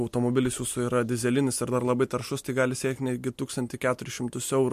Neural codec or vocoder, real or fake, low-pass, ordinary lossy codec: none; real; 14.4 kHz; MP3, 64 kbps